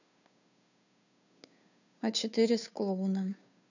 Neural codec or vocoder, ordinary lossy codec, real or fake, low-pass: codec, 16 kHz, 2 kbps, FunCodec, trained on Chinese and English, 25 frames a second; none; fake; 7.2 kHz